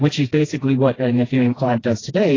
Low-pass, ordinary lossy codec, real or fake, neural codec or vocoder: 7.2 kHz; AAC, 32 kbps; fake; codec, 16 kHz, 1 kbps, FreqCodec, smaller model